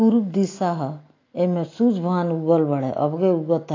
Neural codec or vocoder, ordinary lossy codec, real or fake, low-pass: none; AAC, 32 kbps; real; 7.2 kHz